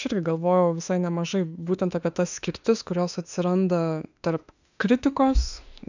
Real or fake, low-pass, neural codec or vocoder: fake; 7.2 kHz; autoencoder, 48 kHz, 32 numbers a frame, DAC-VAE, trained on Japanese speech